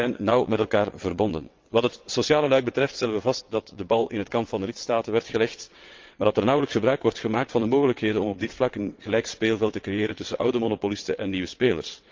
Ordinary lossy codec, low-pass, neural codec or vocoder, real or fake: Opus, 24 kbps; 7.2 kHz; vocoder, 22.05 kHz, 80 mel bands, WaveNeXt; fake